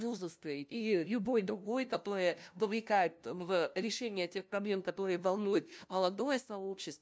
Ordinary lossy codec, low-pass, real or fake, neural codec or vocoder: none; none; fake; codec, 16 kHz, 0.5 kbps, FunCodec, trained on LibriTTS, 25 frames a second